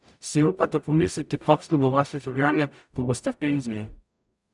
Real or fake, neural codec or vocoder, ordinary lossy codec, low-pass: fake; codec, 44.1 kHz, 0.9 kbps, DAC; none; 10.8 kHz